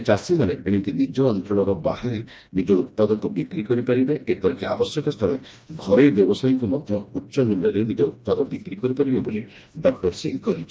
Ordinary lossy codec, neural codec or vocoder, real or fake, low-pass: none; codec, 16 kHz, 1 kbps, FreqCodec, smaller model; fake; none